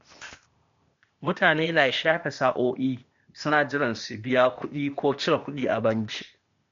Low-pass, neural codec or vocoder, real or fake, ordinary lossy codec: 7.2 kHz; codec, 16 kHz, 0.8 kbps, ZipCodec; fake; MP3, 48 kbps